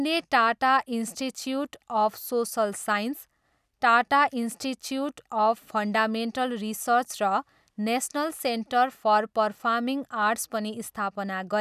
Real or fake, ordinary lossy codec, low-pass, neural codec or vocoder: real; none; 14.4 kHz; none